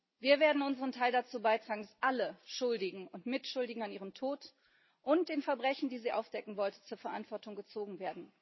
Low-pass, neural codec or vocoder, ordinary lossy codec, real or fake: 7.2 kHz; none; MP3, 24 kbps; real